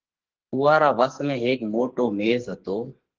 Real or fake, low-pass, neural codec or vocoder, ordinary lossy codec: fake; 7.2 kHz; codec, 44.1 kHz, 2.6 kbps, SNAC; Opus, 16 kbps